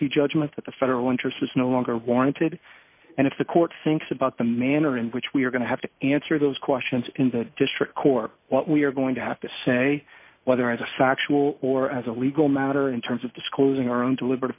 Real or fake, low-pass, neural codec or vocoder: real; 3.6 kHz; none